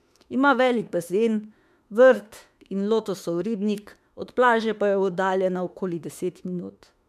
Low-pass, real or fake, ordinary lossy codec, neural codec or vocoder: 14.4 kHz; fake; MP3, 96 kbps; autoencoder, 48 kHz, 32 numbers a frame, DAC-VAE, trained on Japanese speech